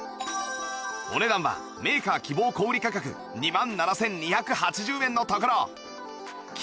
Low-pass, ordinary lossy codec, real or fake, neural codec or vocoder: none; none; real; none